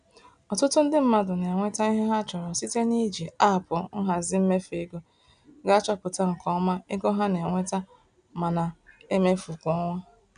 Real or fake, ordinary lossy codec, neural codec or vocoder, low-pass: real; none; none; 9.9 kHz